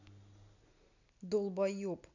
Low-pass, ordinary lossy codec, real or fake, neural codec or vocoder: 7.2 kHz; none; real; none